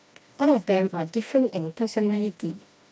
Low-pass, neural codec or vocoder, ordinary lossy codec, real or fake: none; codec, 16 kHz, 1 kbps, FreqCodec, smaller model; none; fake